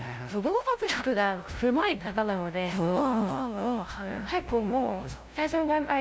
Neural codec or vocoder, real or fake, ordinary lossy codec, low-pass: codec, 16 kHz, 0.5 kbps, FunCodec, trained on LibriTTS, 25 frames a second; fake; none; none